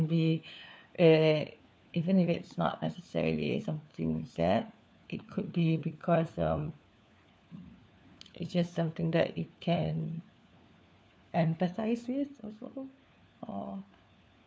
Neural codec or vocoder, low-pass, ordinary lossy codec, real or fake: codec, 16 kHz, 4 kbps, FunCodec, trained on LibriTTS, 50 frames a second; none; none; fake